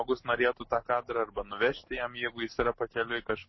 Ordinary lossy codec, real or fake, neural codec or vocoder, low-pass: MP3, 24 kbps; real; none; 7.2 kHz